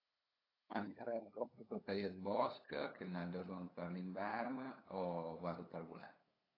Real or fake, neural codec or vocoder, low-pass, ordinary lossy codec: fake; codec, 16 kHz, 8 kbps, FunCodec, trained on LibriTTS, 25 frames a second; 5.4 kHz; AAC, 24 kbps